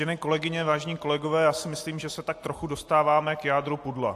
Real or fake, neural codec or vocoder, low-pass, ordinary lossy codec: real; none; 14.4 kHz; AAC, 64 kbps